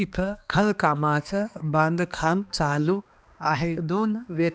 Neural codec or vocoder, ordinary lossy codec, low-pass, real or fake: codec, 16 kHz, 2 kbps, X-Codec, HuBERT features, trained on balanced general audio; none; none; fake